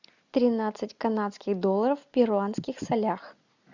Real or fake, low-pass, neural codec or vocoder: real; 7.2 kHz; none